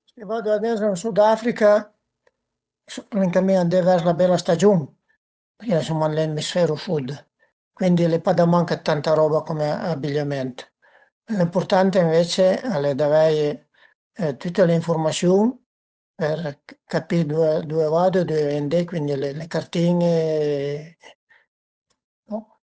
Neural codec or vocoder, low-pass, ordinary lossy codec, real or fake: codec, 16 kHz, 8 kbps, FunCodec, trained on Chinese and English, 25 frames a second; none; none; fake